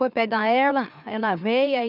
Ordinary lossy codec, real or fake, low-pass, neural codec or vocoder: none; fake; 5.4 kHz; autoencoder, 44.1 kHz, a latent of 192 numbers a frame, MeloTTS